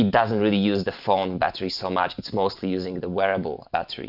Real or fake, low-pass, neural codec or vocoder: real; 5.4 kHz; none